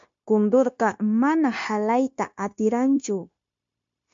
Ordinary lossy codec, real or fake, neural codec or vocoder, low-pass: MP3, 48 kbps; fake; codec, 16 kHz, 0.9 kbps, LongCat-Audio-Codec; 7.2 kHz